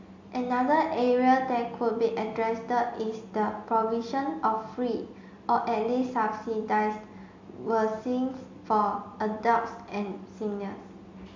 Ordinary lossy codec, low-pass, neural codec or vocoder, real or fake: MP3, 48 kbps; 7.2 kHz; none; real